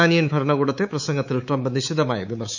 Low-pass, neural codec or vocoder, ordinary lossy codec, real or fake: 7.2 kHz; codec, 24 kHz, 3.1 kbps, DualCodec; none; fake